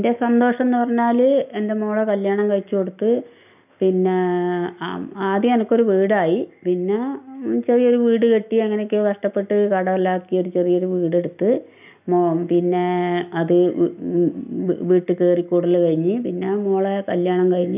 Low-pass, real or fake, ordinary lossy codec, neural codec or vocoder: 3.6 kHz; real; none; none